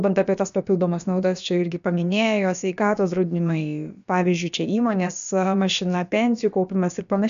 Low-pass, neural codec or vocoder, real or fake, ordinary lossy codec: 7.2 kHz; codec, 16 kHz, about 1 kbps, DyCAST, with the encoder's durations; fake; AAC, 96 kbps